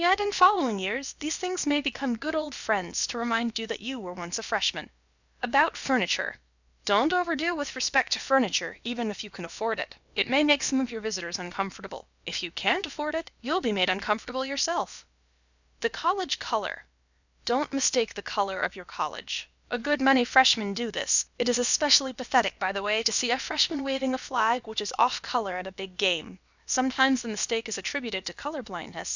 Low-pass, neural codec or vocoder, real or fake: 7.2 kHz; codec, 16 kHz, about 1 kbps, DyCAST, with the encoder's durations; fake